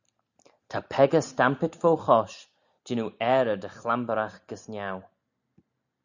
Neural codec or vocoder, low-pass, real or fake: none; 7.2 kHz; real